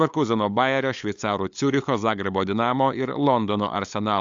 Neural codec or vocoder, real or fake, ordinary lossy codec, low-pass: codec, 16 kHz, 8 kbps, FunCodec, trained on LibriTTS, 25 frames a second; fake; AAC, 64 kbps; 7.2 kHz